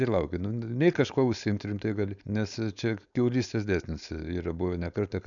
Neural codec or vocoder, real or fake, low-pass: codec, 16 kHz, 4.8 kbps, FACodec; fake; 7.2 kHz